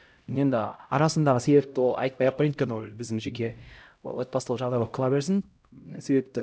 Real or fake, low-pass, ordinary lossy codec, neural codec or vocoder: fake; none; none; codec, 16 kHz, 0.5 kbps, X-Codec, HuBERT features, trained on LibriSpeech